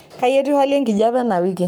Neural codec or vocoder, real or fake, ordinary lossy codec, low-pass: codec, 44.1 kHz, 7.8 kbps, Pupu-Codec; fake; none; none